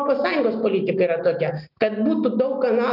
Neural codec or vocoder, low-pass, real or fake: none; 5.4 kHz; real